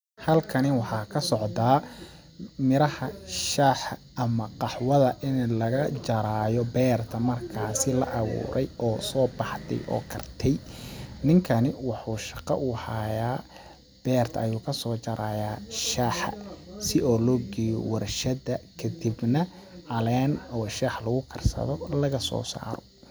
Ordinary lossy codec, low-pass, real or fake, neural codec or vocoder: none; none; real; none